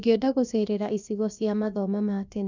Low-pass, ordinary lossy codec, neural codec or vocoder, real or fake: 7.2 kHz; none; codec, 16 kHz, about 1 kbps, DyCAST, with the encoder's durations; fake